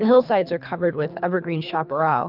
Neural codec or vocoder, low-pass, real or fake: codec, 24 kHz, 3 kbps, HILCodec; 5.4 kHz; fake